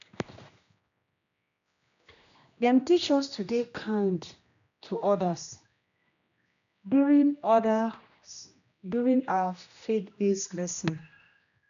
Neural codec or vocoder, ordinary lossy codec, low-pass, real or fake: codec, 16 kHz, 1 kbps, X-Codec, HuBERT features, trained on general audio; MP3, 96 kbps; 7.2 kHz; fake